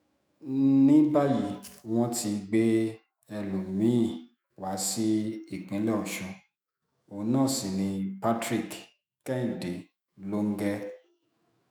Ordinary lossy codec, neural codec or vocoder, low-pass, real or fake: none; autoencoder, 48 kHz, 128 numbers a frame, DAC-VAE, trained on Japanese speech; none; fake